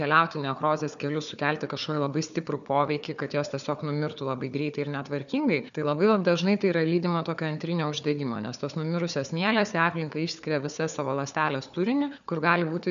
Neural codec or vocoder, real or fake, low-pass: codec, 16 kHz, 4 kbps, FunCodec, trained on Chinese and English, 50 frames a second; fake; 7.2 kHz